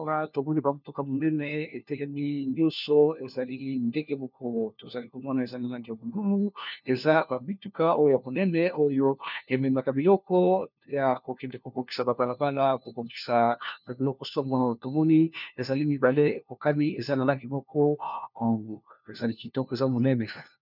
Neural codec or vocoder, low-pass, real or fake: codec, 16 kHz, 1 kbps, FunCodec, trained on LibriTTS, 50 frames a second; 5.4 kHz; fake